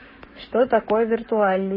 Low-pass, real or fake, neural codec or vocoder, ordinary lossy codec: 5.4 kHz; fake; codec, 44.1 kHz, 7.8 kbps, Pupu-Codec; MP3, 24 kbps